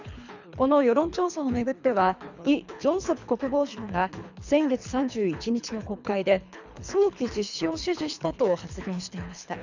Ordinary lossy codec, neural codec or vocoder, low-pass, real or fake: none; codec, 24 kHz, 3 kbps, HILCodec; 7.2 kHz; fake